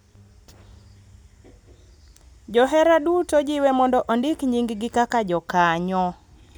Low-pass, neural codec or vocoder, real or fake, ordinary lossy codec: none; none; real; none